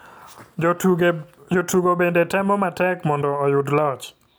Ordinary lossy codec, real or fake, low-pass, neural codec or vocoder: none; real; none; none